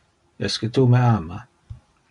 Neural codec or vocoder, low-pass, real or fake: none; 10.8 kHz; real